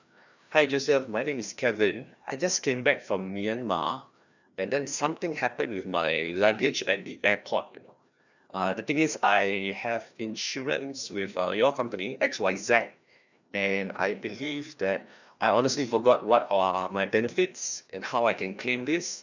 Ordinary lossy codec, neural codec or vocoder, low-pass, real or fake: none; codec, 16 kHz, 1 kbps, FreqCodec, larger model; 7.2 kHz; fake